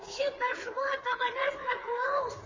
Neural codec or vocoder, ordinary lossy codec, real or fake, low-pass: codec, 16 kHz, 4 kbps, FreqCodec, smaller model; MP3, 32 kbps; fake; 7.2 kHz